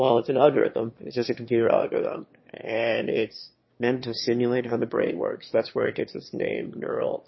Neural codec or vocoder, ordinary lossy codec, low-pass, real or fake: autoencoder, 22.05 kHz, a latent of 192 numbers a frame, VITS, trained on one speaker; MP3, 24 kbps; 7.2 kHz; fake